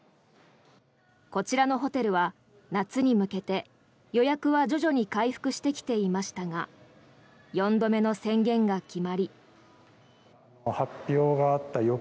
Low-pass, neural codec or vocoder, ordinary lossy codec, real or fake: none; none; none; real